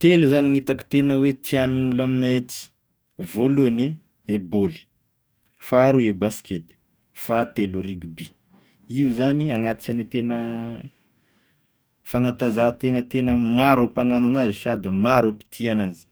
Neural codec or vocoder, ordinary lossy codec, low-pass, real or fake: codec, 44.1 kHz, 2.6 kbps, DAC; none; none; fake